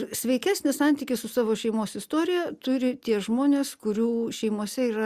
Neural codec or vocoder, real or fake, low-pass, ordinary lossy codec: none; real; 14.4 kHz; Opus, 64 kbps